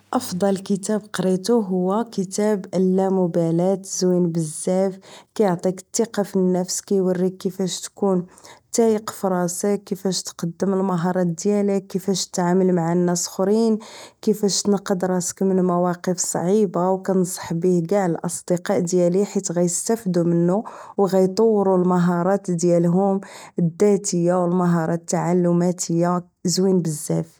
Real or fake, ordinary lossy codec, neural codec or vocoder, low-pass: real; none; none; none